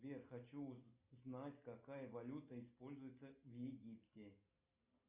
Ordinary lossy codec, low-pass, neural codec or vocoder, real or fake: Opus, 64 kbps; 3.6 kHz; none; real